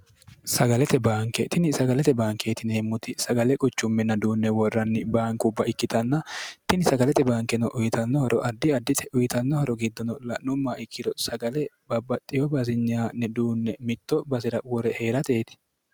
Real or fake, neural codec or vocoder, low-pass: real; none; 19.8 kHz